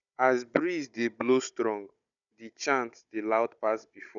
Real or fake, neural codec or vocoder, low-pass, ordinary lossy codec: fake; codec, 16 kHz, 16 kbps, FunCodec, trained on Chinese and English, 50 frames a second; 7.2 kHz; none